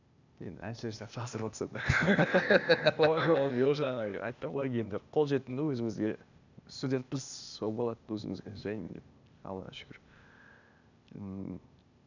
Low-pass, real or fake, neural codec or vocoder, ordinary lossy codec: 7.2 kHz; fake; codec, 16 kHz, 0.8 kbps, ZipCodec; none